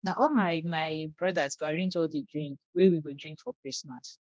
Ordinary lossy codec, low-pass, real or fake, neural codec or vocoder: none; none; fake; codec, 16 kHz, 1 kbps, X-Codec, HuBERT features, trained on general audio